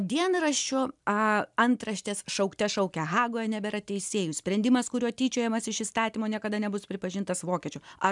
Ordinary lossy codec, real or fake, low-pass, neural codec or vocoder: MP3, 96 kbps; real; 10.8 kHz; none